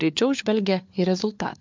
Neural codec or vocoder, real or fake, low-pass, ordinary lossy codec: codec, 16 kHz, 4 kbps, FunCodec, trained on LibriTTS, 50 frames a second; fake; 7.2 kHz; MP3, 64 kbps